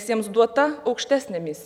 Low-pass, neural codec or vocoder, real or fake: 19.8 kHz; none; real